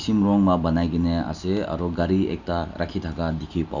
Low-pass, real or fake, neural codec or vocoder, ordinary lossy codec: 7.2 kHz; real; none; none